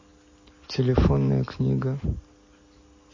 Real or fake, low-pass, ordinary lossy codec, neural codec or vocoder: real; 7.2 kHz; MP3, 32 kbps; none